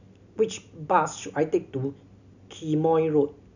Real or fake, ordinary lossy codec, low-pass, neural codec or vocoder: real; none; 7.2 kHz; none